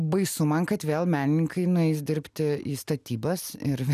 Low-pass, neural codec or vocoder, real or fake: 14.4 kHz; none; real